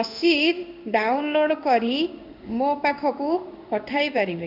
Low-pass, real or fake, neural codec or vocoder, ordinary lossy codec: 5.4 kHz; fake; codec, 16 kHz in and 24 kHz out, 1 kbps, XY-Tokenizer; AAC, 48 kbps